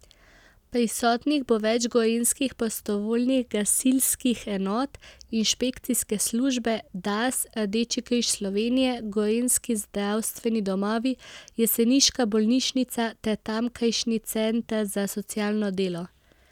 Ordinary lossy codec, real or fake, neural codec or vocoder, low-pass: none; real; none; 19.8 kHz